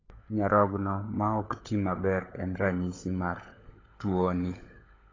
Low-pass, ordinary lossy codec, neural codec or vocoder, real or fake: 7.2 kHz; AAC, 32 kbps; codec, 16 kHz, 16 kbps, FunCodec, trained on LibriTTS, 50 frames a second; fake